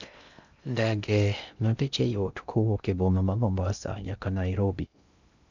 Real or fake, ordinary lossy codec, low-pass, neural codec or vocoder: fake; none; 7.2 kHz; codec, 16 kHz in and 24 kHz out, 0.6 kbps, FocalCodec, streaming, 2048 codes